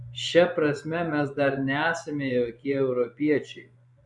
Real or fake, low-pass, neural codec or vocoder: fake; 10.8 kHz; vocoder, 24 kHz, 100 mel bands, Vocos